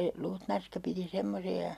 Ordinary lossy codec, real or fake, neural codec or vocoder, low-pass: none; real; none; 14.4 kHz